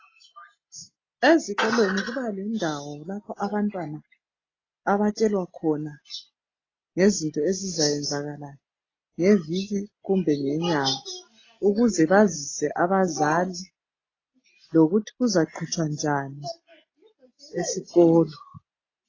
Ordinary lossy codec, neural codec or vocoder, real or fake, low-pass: AAC, 32 kbps; none; real; 7.2 kHz